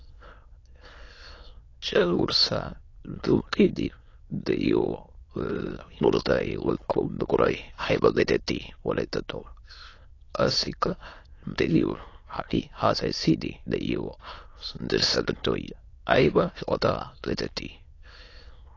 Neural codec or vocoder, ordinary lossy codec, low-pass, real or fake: autoencoder, 22.05 kHz, a latent of 192 numbers a frame, VITS, trained on many speakers; AAC, 32 kbps; 7.2 kHz; fake